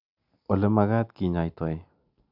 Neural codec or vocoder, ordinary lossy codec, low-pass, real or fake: none; none; 5.4 kHz; real